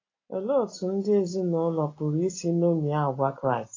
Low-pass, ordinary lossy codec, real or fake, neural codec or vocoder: 7.2 kHz; AAC, 32 kbps; real; none